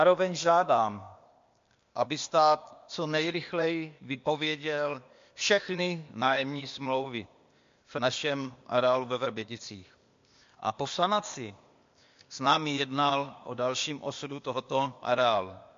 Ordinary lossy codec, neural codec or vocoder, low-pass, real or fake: MP3, 48 kbps; codec, 16 kHz, 0.8 kbps, ZipCodec; 7.2 kHz; fake